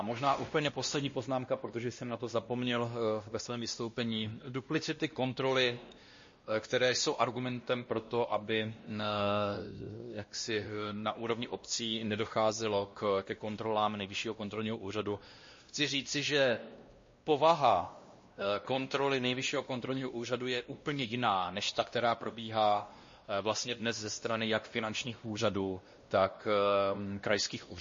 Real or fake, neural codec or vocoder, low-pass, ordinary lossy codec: fake; codec, 16 kHz, 1 kbps, X-Codec, WavLM features, trained on Multilingual LibriSpeech; 7.2 kHz; MP3, 32 kbps